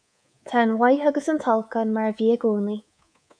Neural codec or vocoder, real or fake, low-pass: codec, 24 kHz, 3.1 kbps, DualCodec; fake; 9.9 kHz